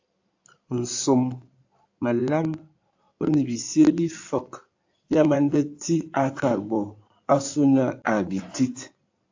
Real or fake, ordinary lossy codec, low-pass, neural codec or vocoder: fake; AAC, 48 kbps; 7.2 kHz; codec, 16 kHz in and 24 kHz out, 2.2 kbps, FireRedTTS-2 codec